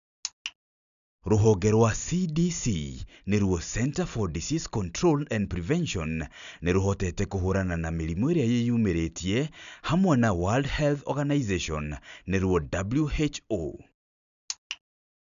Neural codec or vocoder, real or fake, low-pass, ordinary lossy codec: none; real; 7.2 kHz; MP3, 96 kbps